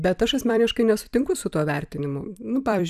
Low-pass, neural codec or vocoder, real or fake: 14.4 kHz; vocoder, 44.1 kHz, 128 mel bands every 256 samples, BigVGAN v2; fake